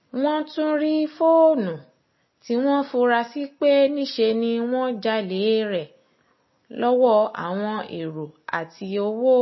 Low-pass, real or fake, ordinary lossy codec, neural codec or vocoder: 7.2 kHz; real; MP3, 24 kbps; none